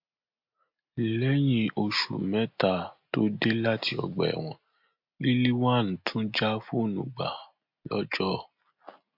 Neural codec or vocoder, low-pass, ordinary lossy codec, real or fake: none; 5.4 kHz; MP3, 48 kbps; real